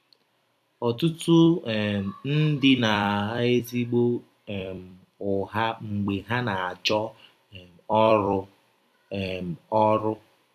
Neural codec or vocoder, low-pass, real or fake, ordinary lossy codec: vocoder, 44.1 kHz, 128 mel bands every 256 samples, BigVGAN v2; 14.4 kHz; fake; none